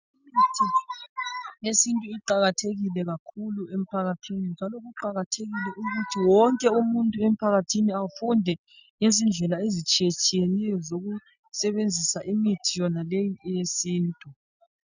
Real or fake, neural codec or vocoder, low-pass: real; none; 7.2 kHz